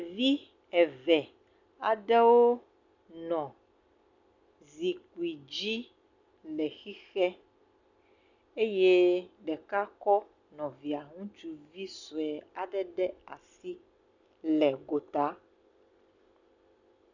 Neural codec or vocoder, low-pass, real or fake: none; 7.2 kHz; real